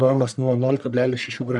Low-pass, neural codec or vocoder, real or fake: 10.8 kHz; codec, 44.1 kHz, 1.7 kbps, Pupu-Codec; fake